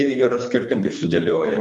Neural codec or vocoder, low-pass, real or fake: codec, 24 kHz, 3 kbps, HILCodec; 10.8 kHz; fake